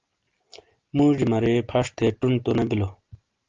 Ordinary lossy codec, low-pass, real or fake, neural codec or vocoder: Opus, 32 kbps; 7.2 kHz; real; none